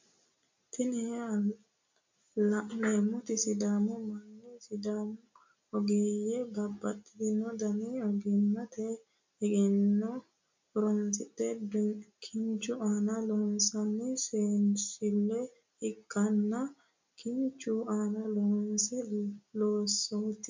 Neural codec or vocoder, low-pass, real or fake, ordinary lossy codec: none; 7.2 kHz; real; MP3, 48 kbps